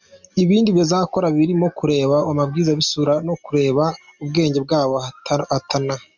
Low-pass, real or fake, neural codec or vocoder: 7.2 kHz; real; none